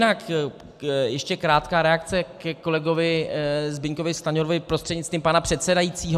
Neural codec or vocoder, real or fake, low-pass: none; real; 14.4 kHz